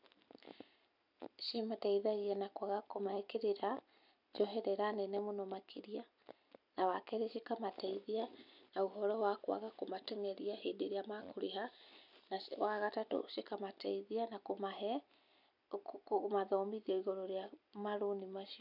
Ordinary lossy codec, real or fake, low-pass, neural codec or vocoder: none; real; 5.4 kHz; none